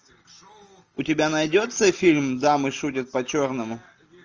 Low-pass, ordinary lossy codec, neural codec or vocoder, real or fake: 7.2 kHz; Opus, 24 kbps; none; real